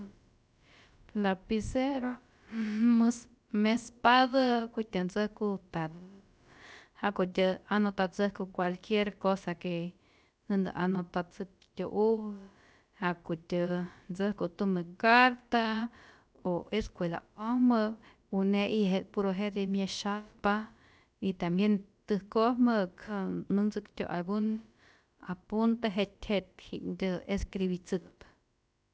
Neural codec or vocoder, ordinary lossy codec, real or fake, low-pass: codec, 16 kHz, about 1 kbps, DyCAST, with the encoder's durations; none; fake; none